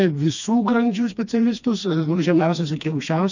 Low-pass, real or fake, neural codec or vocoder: 7.2 kHz; fake; codec, 16 kHz, 2 kbps, FreqCodec, smaller model